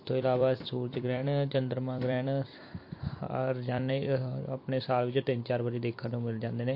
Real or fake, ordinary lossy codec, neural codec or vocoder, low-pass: fake; MP3, 32 kbps; vocoder, 44.1 kHz, 128 mel bands every 256 samples, BigVGAN v2; 5.4 kHz